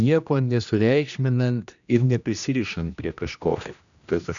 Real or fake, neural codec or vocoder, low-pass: fake; codec, 16 kHz, 1 kbps, X-Codec, HuBERT features, trained on general audio; 7.2 kHz